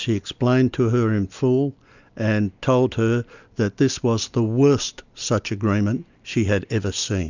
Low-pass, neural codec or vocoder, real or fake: 7.2 kHz; none; real